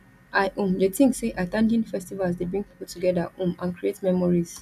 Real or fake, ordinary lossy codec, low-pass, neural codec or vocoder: real; none; 14.4 kHz; none